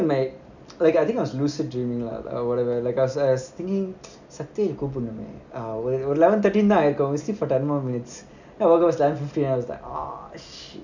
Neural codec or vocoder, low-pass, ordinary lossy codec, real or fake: none; 7.2 kHz; none; real